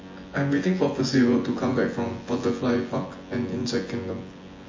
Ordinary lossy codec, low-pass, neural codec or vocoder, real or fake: MP3, 32 kbps; 7.2 kHz; vocoder, 24 kHz, 100 mel bands, Vocos; fake